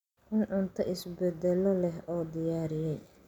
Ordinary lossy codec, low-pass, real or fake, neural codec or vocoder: none; 19.8 kHz; real; none